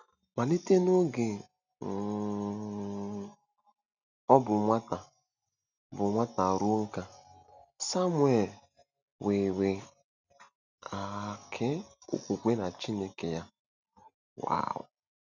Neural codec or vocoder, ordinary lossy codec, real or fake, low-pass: none; none; real; 7.2 kHz